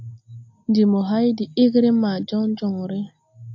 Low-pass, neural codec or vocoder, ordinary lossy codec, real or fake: 7.2 kHz; none; AAC, 48 kbps; real